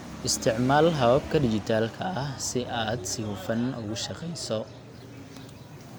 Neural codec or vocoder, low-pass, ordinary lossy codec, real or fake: none; none; none; real